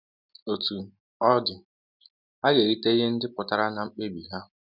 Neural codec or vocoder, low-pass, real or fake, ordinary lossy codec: none; 5.4 kHz; real; none